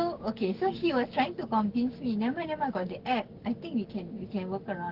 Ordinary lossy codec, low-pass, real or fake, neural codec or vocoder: Opus, 16 kbps; 5.4 kHz; fake; codec, 16 kHz, 6 kbps, DAC